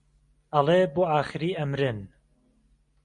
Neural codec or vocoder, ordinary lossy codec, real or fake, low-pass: none; MP3, 48 kbps; real; 10.8 kHz